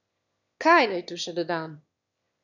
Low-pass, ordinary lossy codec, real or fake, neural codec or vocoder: 7.2 kHz; none; fake; autoencoder, 22.05 kHz, a latent of 192 numbers a frame, VITS, trained on one speaker